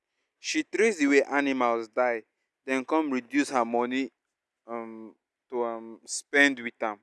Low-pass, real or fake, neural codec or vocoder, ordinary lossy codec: none; real; none; none